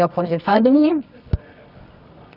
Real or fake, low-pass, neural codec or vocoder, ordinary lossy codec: fake; 5.4 kHz; codec, 24 kHz, 0.9 kbps, WavTokenizer, medium music audio release; none